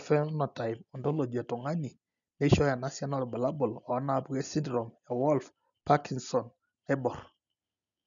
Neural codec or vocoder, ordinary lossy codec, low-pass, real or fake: none; none; 7.2 kHz; real